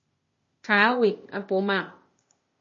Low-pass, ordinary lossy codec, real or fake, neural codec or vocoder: 7.2 kHz; MP3, 32 kbps; fake; codec, 16 kHz, 0.8 kbps, ZipCodec